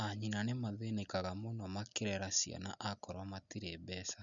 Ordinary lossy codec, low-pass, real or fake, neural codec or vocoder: none; 7.2 kHz; real; none